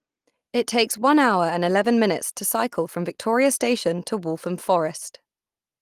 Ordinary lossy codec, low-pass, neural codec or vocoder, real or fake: Opus, 24 kbps; 14.4 kHz; none; real